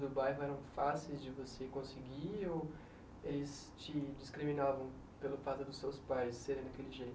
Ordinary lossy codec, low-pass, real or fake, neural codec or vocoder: none; none; real; none